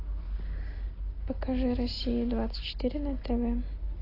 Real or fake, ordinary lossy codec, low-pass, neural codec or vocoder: real; AAC, 24 kbps; 5.4 kHz; none